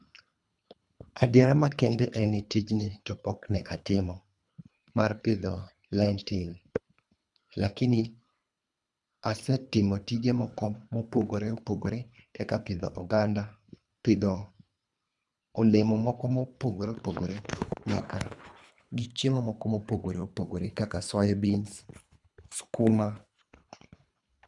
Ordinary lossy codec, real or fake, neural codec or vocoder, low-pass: none; fake; codec, 24 kHz, 3 kbps, HILCodec; 10.8 kHz